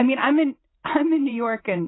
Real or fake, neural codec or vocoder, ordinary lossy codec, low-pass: fake; vocoder, 44.1 kHz, 128 mel bands every 512 samples, BigVGAN v2; AAC, 16 kbps; 7.2 kHz